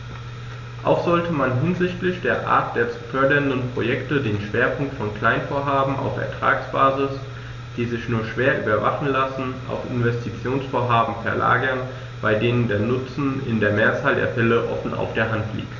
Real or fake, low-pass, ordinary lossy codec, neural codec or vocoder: real; 7.2 kHz; none; none